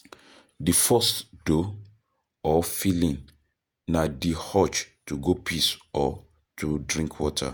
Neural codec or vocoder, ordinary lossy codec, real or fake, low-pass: vocoder, 48 kHz, 128 mel bands, Vocos; none; fake; none